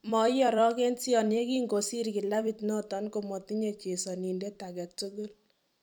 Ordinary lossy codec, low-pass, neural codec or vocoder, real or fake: none; none; vocoder, 44.1 kHz, 128 mel bands every 256 samples, BigVGAN v2; fake